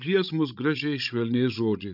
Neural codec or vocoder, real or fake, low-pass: codec, 16 kHz, 16 kbps, FreqCodec, larger model; fake; 5.4 kHz